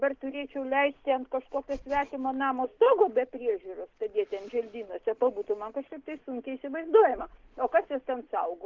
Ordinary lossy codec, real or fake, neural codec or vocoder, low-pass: Opus, 16 kbps; real; none; 7.2 kHz